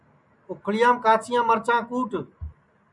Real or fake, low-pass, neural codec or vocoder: real; 10.8 kHz; none